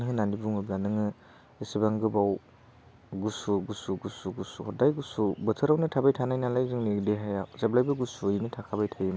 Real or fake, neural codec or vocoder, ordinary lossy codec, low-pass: real; none; none; none